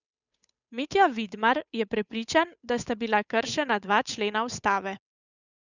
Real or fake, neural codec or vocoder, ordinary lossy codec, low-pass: fake; codec, 16 kHz, 8 kbps, FunCodec, trained on Chinese and English, 25 frames a second; none; 7.2 kHz